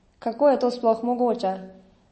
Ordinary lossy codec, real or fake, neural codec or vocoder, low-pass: MP3, 32 kbps; real; none; 9.9 kHz